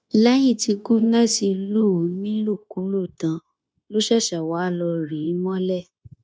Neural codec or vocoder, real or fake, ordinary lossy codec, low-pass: codec, 16 kHz, 0.9 kbps, LongCat-Audio-Codec; fake; none; none